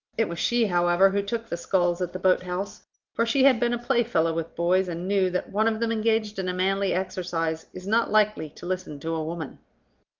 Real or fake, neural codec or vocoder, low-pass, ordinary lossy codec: real; none; 7.2 kHz; Opus, 32 kbps